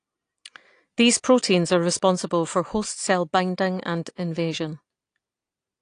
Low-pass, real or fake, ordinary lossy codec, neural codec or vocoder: 9.9 kHz; real; AAC, 48 kbps; none